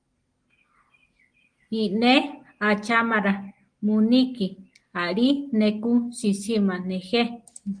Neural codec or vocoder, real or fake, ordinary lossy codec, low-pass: none; real; Opus, 24 kbps; 9.9 kHz